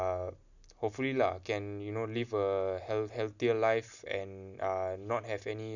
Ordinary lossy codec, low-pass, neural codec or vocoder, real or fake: none; 7.2 kHz; none; real